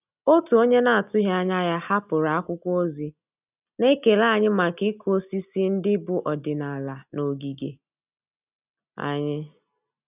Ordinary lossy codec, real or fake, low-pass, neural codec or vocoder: none; real; 3.6 kHz; none